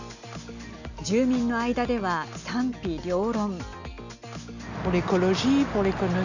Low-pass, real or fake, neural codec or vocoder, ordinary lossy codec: 7.2 kHz; real; none; none